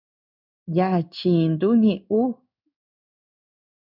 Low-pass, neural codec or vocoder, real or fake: 5.4 kHz; codec, 16 kHz in and 24 kHz out, 1 kbps, XY-Tokenizer; fake